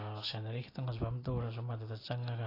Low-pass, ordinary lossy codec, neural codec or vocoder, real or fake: 5.4 kHz; AAC, 24 kbps; none; real